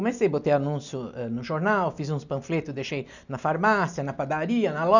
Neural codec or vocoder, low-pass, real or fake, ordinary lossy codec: none; 7.2 kHz; real; none